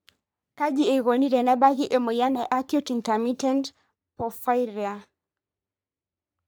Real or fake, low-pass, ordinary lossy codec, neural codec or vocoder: fake; none; none; codec, 44.1 kHz, 3.4 kbps, Pupu-Codec